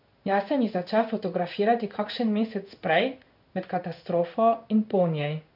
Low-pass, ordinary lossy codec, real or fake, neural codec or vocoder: 5.4 kHz; none; fake; codec, 16 kHz in and 24 kHz out, 1 kbps, XY-Tokenizer